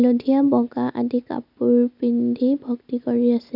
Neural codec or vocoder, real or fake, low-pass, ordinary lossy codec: none; real; 5.4 kHz; none